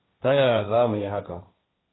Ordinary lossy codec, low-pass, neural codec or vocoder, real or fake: AAC, 16 kbps; 7.2 kHz; codec, 16 kHz, 1.1 kbps, Voila-Tokenizer; fake